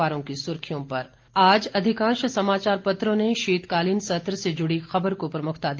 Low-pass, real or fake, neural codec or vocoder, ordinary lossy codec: 7.2 kHz; real; none; Opus, 32 kbps